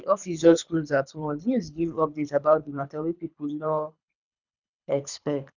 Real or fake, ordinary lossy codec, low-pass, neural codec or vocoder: fake; none; 7.2 kHz; codec, 24 kHz, 3 kbps, HILCodec